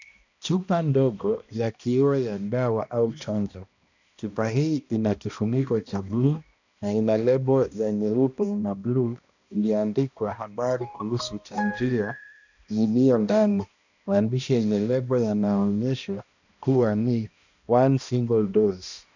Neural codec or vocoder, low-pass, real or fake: codec, 16 kHz, 1 kbps, X-Codec, HuBERT features, trained on balanced general audio; 7.2 kHz; fake